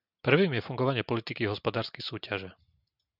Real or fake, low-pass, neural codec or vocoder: real; 5.4 kHz; none